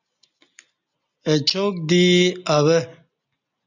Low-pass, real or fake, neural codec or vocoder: 7.2 kHz; real; none